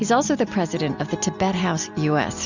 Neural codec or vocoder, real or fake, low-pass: none; real; 7.2 kHz